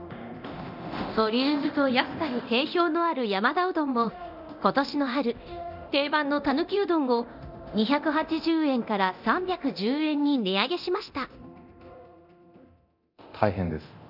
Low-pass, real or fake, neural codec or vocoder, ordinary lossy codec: 5.4 kHz; fake; codec, 24 kHz, 0.9 kbps, DualCodec; none